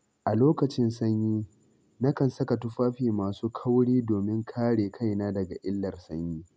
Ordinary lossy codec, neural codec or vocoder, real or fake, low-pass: none; none; real; none